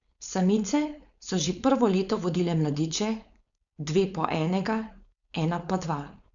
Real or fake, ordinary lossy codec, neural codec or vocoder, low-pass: fake; none; codec, 16 kHz, 4.8 kbps, FACodec; 7.2 kHz